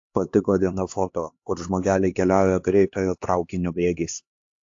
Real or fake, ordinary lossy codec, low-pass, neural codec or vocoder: fake; AAC, 48 kbps; 7.2 kHz; codec, 16 kHz, 2 kbps, X-Codec, HuBERT features, trained on LibriSpeech